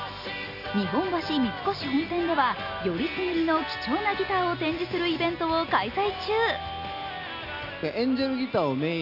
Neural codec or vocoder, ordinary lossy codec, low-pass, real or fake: none; AAC, 48 kbps; 5.4 kHz; real